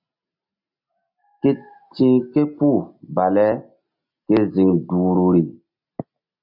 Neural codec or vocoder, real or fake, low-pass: none; real; 5.4 kHz